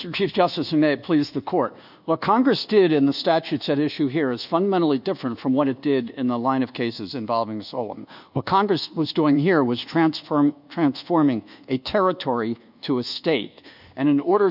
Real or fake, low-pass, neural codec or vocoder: fake; 5.4 kHz; codec, 24 kHz, 1.2 kbps, DualCodec